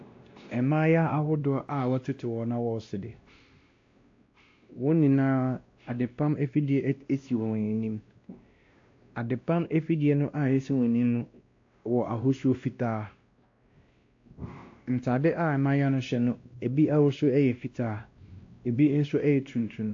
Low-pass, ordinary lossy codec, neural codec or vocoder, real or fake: 7.2 kHz; AAC, 48 kbps; codec, 16 kHz, 1 kbps, X-Codec, WavLM features, trained on Multilingual LibriSpeech; fake